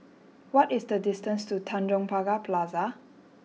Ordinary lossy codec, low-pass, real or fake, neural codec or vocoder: none; none; real; none